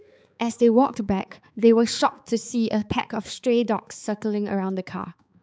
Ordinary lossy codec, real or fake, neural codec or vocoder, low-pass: none; fake; codec, 16 kHz, 4 kbps, X-Codec, HuBERT features, trained on balanced general audio; none